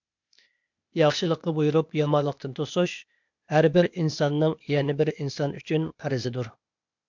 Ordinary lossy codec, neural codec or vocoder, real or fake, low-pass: MP3, 64 kbps; codec, 16 kHz, 0.8 kbps, ZipCodec; fake; 7.2 kHz